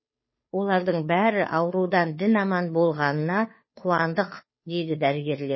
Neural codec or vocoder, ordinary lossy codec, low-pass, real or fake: codec, 16 kHz, 2 kbps, FunCodec, trained on Chinese and English, 25 frames a second; MP3, 24 kbps; 7.2 kHz; fake